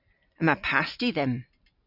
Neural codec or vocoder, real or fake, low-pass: none; real; 5.4 kHz